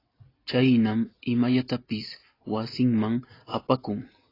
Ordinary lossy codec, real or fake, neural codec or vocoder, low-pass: AAC, 24 kbps; real; none; 5.4 kHz